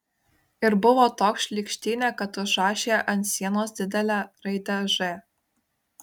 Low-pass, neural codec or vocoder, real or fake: 19.8 kHz; none; real